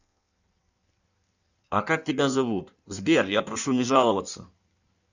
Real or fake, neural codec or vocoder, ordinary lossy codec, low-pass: fake; codec, 16 kHz in and 24 kHz out, 1.1 kbps, FireRedTTS-2 codec; none; 7.2 kHz